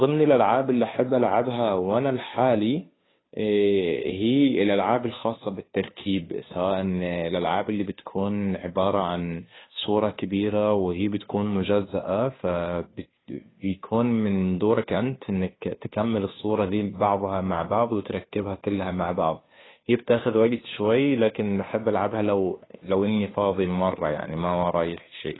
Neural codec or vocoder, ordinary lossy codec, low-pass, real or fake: codec, 16 kHz, 2 kbps, FunCodec, trained on Chinese and English, 25 frames a second; AAC, 16 kbps; 7.2 kHz; fake